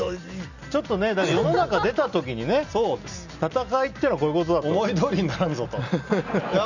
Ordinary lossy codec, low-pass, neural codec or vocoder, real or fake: none; 7.2 kHz; none; real